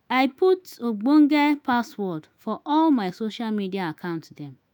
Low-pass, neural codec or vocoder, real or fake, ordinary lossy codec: 19.8 kHz; autoencoder, 48 kHz, 128 numbers a frame, DAC-VAE, trained on Japanese speech; fake; none